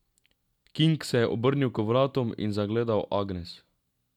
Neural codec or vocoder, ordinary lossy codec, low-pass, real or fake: none; none; 19.8 kHz; real